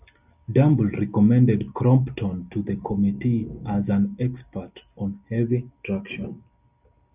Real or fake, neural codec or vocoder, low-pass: real; none; 3.6 kHz